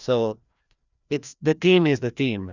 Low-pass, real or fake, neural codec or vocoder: 7.2 kHz; fake; codec, 16 kHz, 1 kbps, FreqCodec, larger model